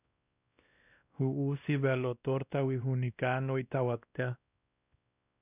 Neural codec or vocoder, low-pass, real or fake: codec, 16 kHz, 1 kbps, X-Codec, WavLM features, trained on Multilingual LibriSpeech; 3.6 kHz; fake